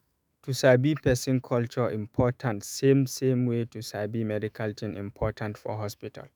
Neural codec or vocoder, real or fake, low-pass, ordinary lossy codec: autoencoder, 48 kHz, 128 numbers a frame, DAC-VAE, trained on Japanese speech; fake; none; none